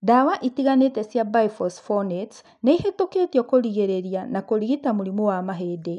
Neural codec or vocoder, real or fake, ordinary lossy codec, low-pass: none; real; none; 10.8 kHz